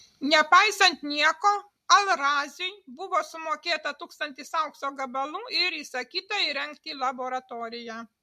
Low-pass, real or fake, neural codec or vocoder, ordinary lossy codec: 14.4 kHz; real; none; MP3, 64 kbps